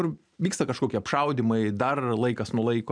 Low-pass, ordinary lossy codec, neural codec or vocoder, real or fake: 9.9 kHz; MP3, 96 kbps; none; real